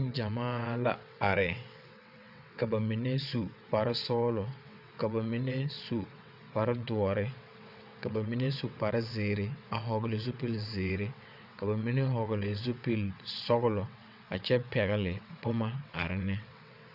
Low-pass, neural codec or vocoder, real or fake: 5.4 kHz; vocoder, 22.05 kHz, 80 mel bands, WaveNeXt; fake